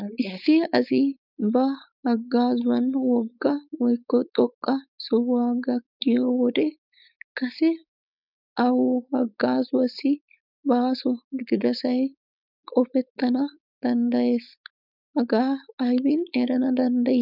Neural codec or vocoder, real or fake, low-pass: codec, 16 kHz, 4.8 kbps, FACodec; fake; 5.4 kHz